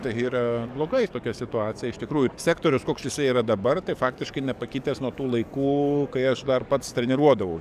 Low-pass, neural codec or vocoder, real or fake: 14.4 kHz; codec, 44.1 kHz, 7.8 kbps, DAC; fake